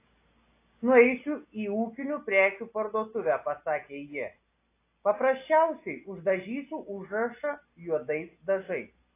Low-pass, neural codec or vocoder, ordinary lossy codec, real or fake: 3.6 kHz; none; AAC, 24 kbps; real